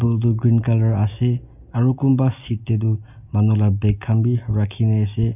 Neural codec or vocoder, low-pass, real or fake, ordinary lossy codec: none; 3.6 kHz; real; none